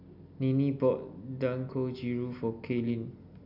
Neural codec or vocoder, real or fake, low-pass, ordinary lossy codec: none; real; 5.4 kHz; none